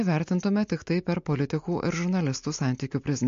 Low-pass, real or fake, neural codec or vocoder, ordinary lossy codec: 7.2 kHz; real; none; MP3, 48 kbps